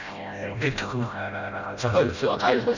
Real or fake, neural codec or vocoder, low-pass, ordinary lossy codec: fake; codec, 16 kHz, 0.5 kbps, FreqCodec, smaller model; 7.2 kHz; none